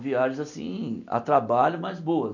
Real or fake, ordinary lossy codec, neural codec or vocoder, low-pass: real; none; none; 7.2 kHz